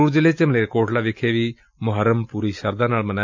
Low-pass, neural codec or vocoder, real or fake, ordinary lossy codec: 7.2 kHz; none; real; MP3, 64 kbps